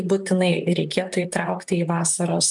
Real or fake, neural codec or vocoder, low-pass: fake; vocoder, 44.1 kHz, 128 mel bands, Pupu-Vocoder; 10.8 kHz